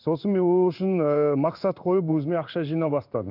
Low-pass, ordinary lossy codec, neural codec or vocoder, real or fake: 5.4 kHz; none; codec, 16 kHz in and 24 kHz out, 1 kbps, XY-Tokenizer; fake